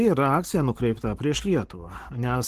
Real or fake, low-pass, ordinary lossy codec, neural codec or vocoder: fake; 14.4 kHz; Opus, 16 kbps; codec, 44.1 kHz, 7.8 kbps, Pupu-Codec